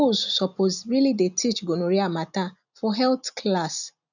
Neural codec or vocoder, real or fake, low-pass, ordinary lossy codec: none; real; 7.2 kHz; none